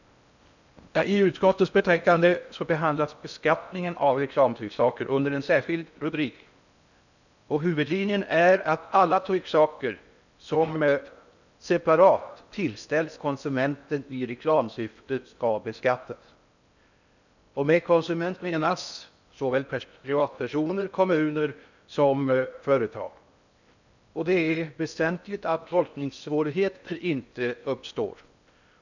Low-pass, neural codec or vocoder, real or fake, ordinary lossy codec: 7.2 kHz; codec, 16 kHz in and 24 kHz out, 0.6 kbps, FocalCodec, streaming, 4096 codes; fake; none